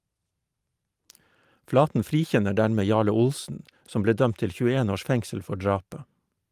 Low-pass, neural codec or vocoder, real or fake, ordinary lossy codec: 14.4 kHz; vocoder, 44.1 kHz, 128 mel bands every 512 samples, BigVGAN v2; fake; Opus, 32 kbps